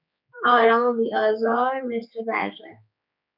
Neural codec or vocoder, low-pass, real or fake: codec, 16 kHz, 4 kbps, X-Codec, HuBERT features, trained on general audio; 5.4 kHz; fake